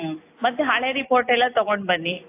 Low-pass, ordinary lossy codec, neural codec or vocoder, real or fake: 3.6 kHz; AAC, 24 kbps; none; real